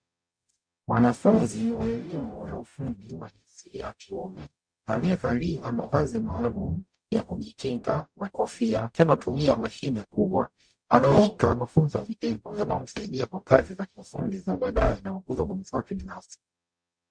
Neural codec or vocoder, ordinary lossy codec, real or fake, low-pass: codec, 44.1 kHz, 0.9 kbps, DAC; MP3, 64 kbps; fake; 9.9 kHz